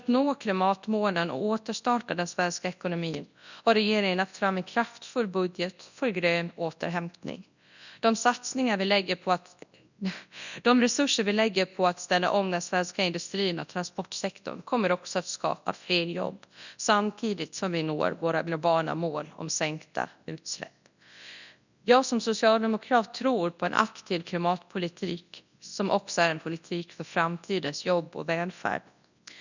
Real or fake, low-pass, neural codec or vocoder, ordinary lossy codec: fake; 7.2 kHz; codec, 24 kHz, 0.9 kbps, WavTokenizer, large speech release; none